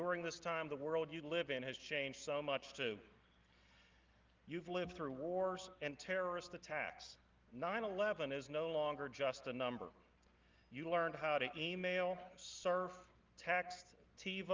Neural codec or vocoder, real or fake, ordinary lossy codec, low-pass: none; real; Opus, 32 kbps; 7.2 kHz